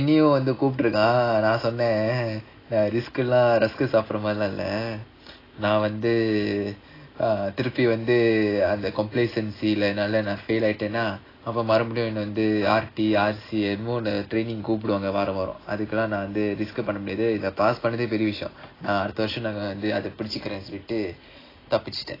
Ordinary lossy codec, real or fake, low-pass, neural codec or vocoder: AAC, 24 kbps; real; 5.4 kHz; none